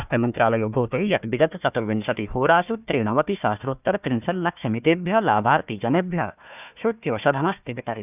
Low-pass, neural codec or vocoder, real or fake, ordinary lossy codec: 3.6 kHz; codec, 16 kHz, 1 kbps, FunCodec, trained on Chinese and English, 50 frames a second; fake; none